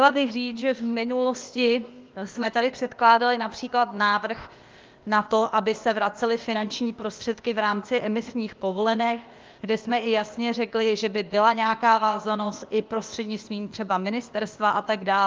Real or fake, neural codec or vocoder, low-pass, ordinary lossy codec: fake; codec, 16 kHz, 0.8 kbps, ZipCodec; 7.2 kHz; Opus, 24 kbps